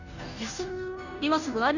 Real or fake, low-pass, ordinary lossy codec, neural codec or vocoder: fake; 7.2 kHz; none; codec, 16 kHz, 0.5 kbps, FunCodec, trained on Chinese and English, 25 frames a second